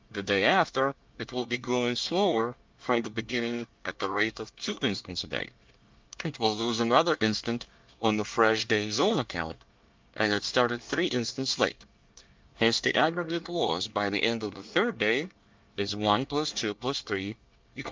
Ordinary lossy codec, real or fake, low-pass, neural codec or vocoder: Opus, 24 kbps; fake; 7.2 kHz; codec, 24 kHz, 1 kbps, SNAC